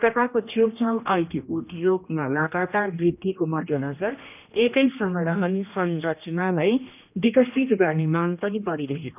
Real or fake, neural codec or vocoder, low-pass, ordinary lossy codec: fake; codec, 16 kHz, 1 kbps, X-Codec, HuBERT features, trained on general audio; 3.6 kHz; none